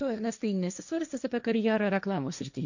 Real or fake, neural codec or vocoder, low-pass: fake; codec, 16 kHz, 1.1 kbps, Voila-Tokenizer; 7.2 kHz